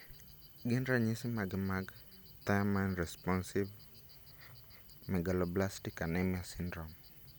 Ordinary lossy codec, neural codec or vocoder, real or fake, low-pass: none; none; real; none